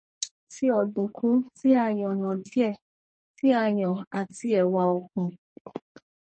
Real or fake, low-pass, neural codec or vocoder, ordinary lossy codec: fake; 9.9 kHz; codec, 44.1 kHz, 2.6 kbps, SNAC; MP3, 32 kbps